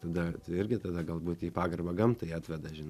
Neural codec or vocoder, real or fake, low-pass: none; real; 14.4 kHz